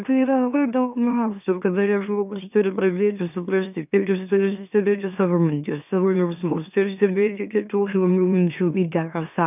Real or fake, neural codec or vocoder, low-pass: fake; autoencoder, 44.1 kHz, a latent of 192 numbers a frame, MeloTTS; 3.6 kHz